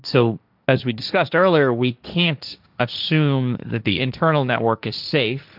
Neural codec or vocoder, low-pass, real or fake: codec, 16 kHz, 1.1 kbps, Voila-Tokenizer; 5.4 kHz; fake